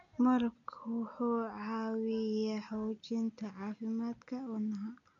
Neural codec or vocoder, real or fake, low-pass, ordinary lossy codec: none; real; 7.2 kHz; none